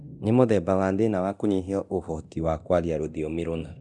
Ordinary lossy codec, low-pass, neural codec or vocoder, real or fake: none; 10.8 kHz; codec, 24 kHz, 0.9 kbps, DualCodec; fake